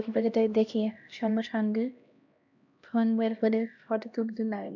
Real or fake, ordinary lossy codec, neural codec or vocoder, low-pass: fake; none; codec, 16 kHz, 1 kbps, X-Codec, HuBERT features, trained on LibriSpeech; 7.2 kHz